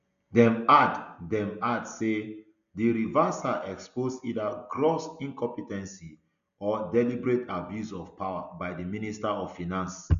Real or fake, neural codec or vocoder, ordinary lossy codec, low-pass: real; none; none; 7.2 kHz